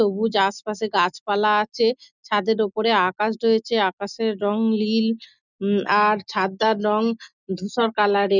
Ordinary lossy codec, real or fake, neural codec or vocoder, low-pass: none; real; none; 7.2 kHz